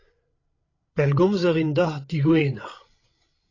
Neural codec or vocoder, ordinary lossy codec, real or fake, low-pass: codec, 16 kHz, 16 kbps, FreqCodec, larger model; AAC, 32 kbps; fake; 7.2 kHz